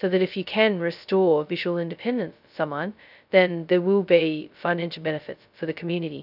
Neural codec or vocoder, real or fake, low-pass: codec, 16 kHz, 0.2 kbps, FocalCodec; fake; 5.4 kHz